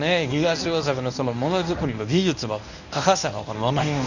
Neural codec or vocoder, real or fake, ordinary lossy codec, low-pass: codec, 24 kHz, 0.9 kbps, WavTokenizer, medium speech release version 1; fake; none; 7.2 kHz